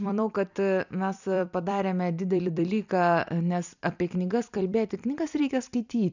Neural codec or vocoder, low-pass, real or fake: vocoder, 44.1 kHz, 128 mel bands every 256 samples, BigVGAN v2; 7.2 kHz; fake